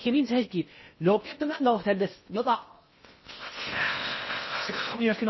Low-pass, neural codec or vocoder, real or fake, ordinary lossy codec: 7.2 kHz; codec, 16 kHz in and 24 kHz out, 0.6 kbps, FocalCodec, streaming, 4096 codes; fake; MP3, 24 kbps